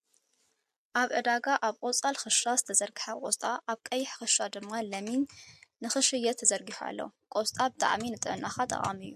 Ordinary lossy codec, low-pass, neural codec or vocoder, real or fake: MP3, 64 kbps; 14.4 kHz; none; real